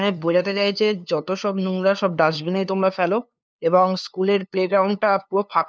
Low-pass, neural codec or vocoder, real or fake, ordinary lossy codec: none; codec, 16 kHz, 2 kbps, FunCodec, trained on LibriTTS, 25 frames a second; fake; none